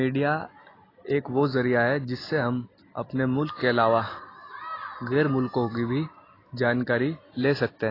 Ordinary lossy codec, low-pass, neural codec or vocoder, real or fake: AAC, 24 kbps; 5.4 kHz; none; real